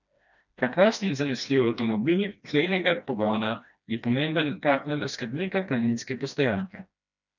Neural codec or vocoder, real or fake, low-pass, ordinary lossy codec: codec, 16 kHz, 1 kbps, FreqCodec, smaller model; fake; 7.2 kHz; none